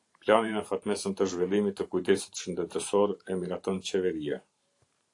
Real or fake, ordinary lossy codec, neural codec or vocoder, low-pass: fake; AAC, 48 kbps; vocoder, 44.1 kHz, 128 mel bands every 512 samples, BigVGAN v2; 10.8 kHz